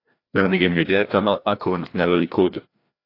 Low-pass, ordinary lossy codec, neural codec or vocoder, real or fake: 5.4 kHz; AAC, 32 kbps; codec, 16 kHz, 1 kbps, FreqCodec, larger model; fake